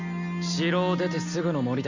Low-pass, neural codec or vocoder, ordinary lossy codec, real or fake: 7.2 kHz; none; Opus, 64 kbps; real